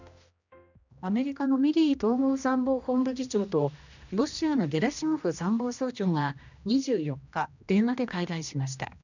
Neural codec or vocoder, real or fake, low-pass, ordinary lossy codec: codec, 16 kHz, 1 kbps, X-Codec, HuBERT features, trained on general audio; fake; 7.2 kHz; none